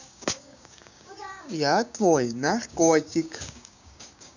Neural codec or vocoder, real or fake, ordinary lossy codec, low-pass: codec, 16 kHz, 6 kbps, DAC; fake; none; 7.2 kHz